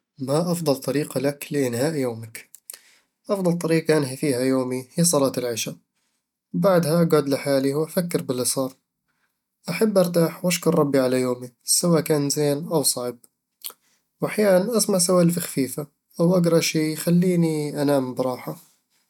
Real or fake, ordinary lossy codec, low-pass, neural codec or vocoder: real; none; 19.8 kHz; none